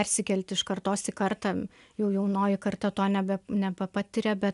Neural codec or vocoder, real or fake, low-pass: none; real; 10.8 kHz